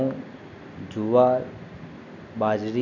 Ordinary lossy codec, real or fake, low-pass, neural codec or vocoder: none; real; 7.2 kHz; none